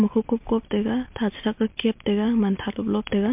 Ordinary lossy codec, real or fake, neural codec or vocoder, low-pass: MP3, 32 kbps; real; none; 3.6 kHz